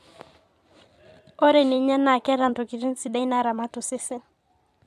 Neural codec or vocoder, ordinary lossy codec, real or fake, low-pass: vocoder, 44.1 kHz, 128 mel bands every 256 samples, BigVGAN v2; AAC, 96 kbps; fake; 14.4 kHz